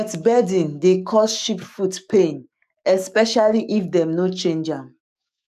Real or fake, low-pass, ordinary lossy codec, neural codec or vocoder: fake; 14.4 kHz; none; codec, 44.1 kHz, 7.8 kbps, DAC